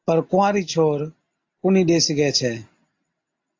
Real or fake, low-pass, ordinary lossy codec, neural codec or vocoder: real; 7.2 kHz; AAC, 48 kbps; none